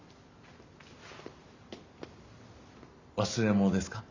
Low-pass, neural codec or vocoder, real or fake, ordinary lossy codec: 7.2 kHz; none; real; Opus, 64 kbps